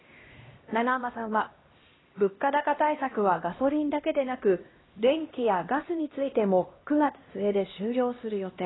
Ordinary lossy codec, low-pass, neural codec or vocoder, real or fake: AAC, 16 kbps; 7.2 kHz; codec, 16 kHz, 1 kbps, X-Codec, HuBERT features, trained on LibriSpeech; fake